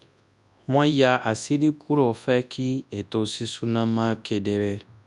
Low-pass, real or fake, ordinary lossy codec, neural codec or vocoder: 10.8 kHz; fake; none; codec, 24 kHz, 0.9 kbps, WavTokenizer, large speech release